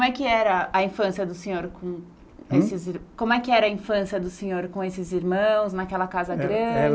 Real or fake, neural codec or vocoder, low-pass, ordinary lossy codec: real; none; none; none